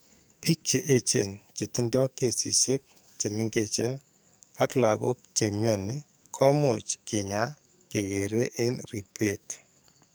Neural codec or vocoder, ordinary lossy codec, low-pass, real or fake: codec, 44.1 kHz, 2.6 kbps, SNAC; none; none; fake